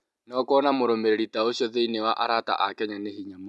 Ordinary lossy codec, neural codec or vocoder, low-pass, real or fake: none; none; none; real